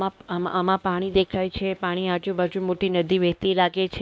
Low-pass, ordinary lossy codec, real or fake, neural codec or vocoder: none; none; fake; codec, 16 kHz, 2 kbps, X-Codec, WavLM features, trained on Multilingual LibriSpeech